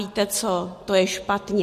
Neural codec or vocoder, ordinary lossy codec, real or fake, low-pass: none; MP3, 64 kbps; real; 14.4 kHz